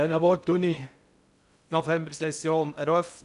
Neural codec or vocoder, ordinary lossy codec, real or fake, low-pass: codec, 16 kHz in and 24 kHz out, 0.6 kbps, FocalCodec, streaming, 2048 codes; none; fake; 10.8 kHz